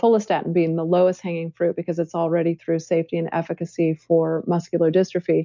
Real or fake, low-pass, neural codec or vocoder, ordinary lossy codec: real; 7.2 kHz; none; MP3, 64 kbps